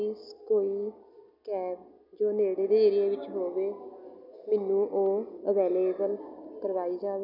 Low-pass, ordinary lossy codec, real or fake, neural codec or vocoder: 5.4 kHz; none; real; none